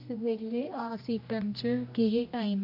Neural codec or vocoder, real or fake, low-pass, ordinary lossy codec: codec, 16 kHz, 1 kbps, X-Codec, HuBERT features, trained on general audio; fake; 5.4 kHz; none